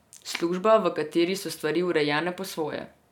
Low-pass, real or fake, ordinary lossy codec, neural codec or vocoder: 19.8 kHz; real; none; none